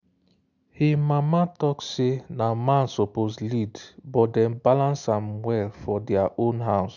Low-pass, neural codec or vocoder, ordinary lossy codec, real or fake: 7.2 kHz; none; none; real